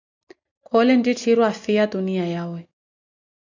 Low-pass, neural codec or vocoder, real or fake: 7.2 kHz; none; real